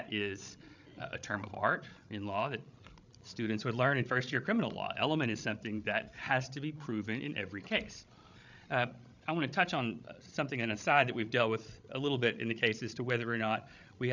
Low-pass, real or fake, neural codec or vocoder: 7.2 kHz; fake; codec, 16 kHz, 16 kbps, FreqCodec, larger model